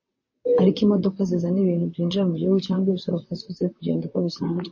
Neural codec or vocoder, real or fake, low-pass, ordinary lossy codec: vocoder, 44.1 kHz, 128 mel bands, Pupu-Vocoder; fake; 7.2 kHz; MP3, 32 kbps